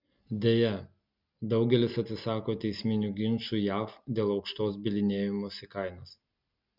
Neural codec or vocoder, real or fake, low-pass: none; real; 5.4 kHz